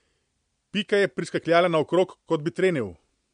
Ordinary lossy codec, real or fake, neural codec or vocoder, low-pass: MP3, 64 kbps; real; none; 9.9 kHz